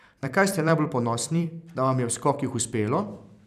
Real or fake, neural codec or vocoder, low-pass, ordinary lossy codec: fake; vocoder, 44.1 kHz, 128 mel bands every 256 samples, BigVGAN v2; 14.4 kHz; none